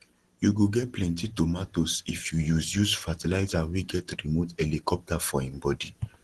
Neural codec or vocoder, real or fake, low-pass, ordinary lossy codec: none; real; 10.8 kHz; Opus, 16 kbps